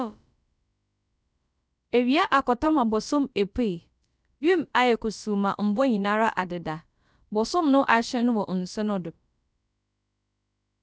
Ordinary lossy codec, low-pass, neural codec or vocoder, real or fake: none; none; codec, 16 kHz, about 1 kbps, DyCAST, with the encoder's durations; fake